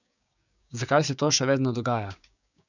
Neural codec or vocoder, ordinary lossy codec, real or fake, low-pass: codec, 16 kHz, 6 kbps, DAC; none; fake; 7.2 kHz